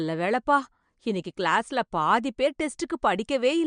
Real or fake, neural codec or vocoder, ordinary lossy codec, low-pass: real; none; MP3, 64 kbps; 9.9 kHz